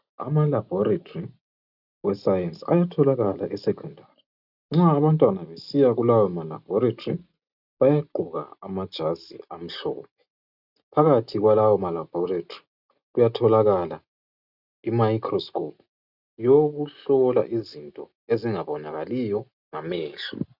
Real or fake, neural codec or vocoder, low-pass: real; none; 5.4 kHz